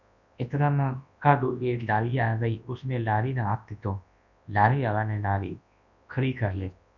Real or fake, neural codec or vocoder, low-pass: fake; codec, 24 kHz, 0.9 kbps, WavTokenizer, large speech release; 7.2 kHz